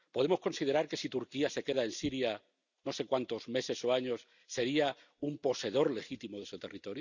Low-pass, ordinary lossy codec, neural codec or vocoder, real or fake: 7.2 kHz; none; none; real